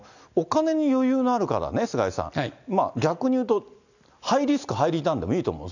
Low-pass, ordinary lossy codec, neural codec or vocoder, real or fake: 7.2 kHz; none; none; real